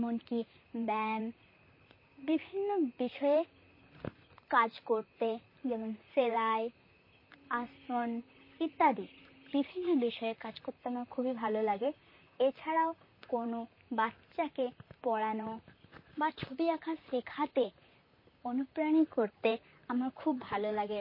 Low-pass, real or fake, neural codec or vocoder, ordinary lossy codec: 5.4 kHz; fake; vocoder, 44.1 kHz, 128 mel bands, Pupu-Vocoder; MP3, 24 kbps